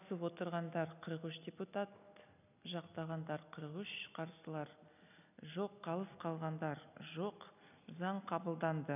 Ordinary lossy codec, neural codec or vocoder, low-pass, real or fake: none; none; 3.6 kHz; real